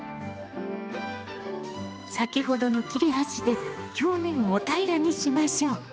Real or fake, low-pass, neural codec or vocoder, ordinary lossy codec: fake; none; codec, 16 kHz, 2 kbps, X-Codec, HuBERT features, trained on general audio; none